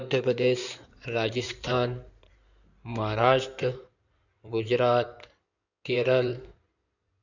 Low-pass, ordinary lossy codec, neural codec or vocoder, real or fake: 7.2 kHz; none; codec, 16 kHz in and 24 kHz out, 2.2 kbps, FireRedTTS-2 codec; fake